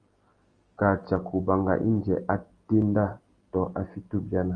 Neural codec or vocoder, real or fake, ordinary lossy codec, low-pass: none; real; Opus, 24 kbps; 9.9 kHz